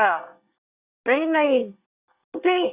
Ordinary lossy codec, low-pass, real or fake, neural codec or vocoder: Opus, 64 kbps; 3.6 kHz; fake; codec, 24 kHz, 1 kbps, SNAC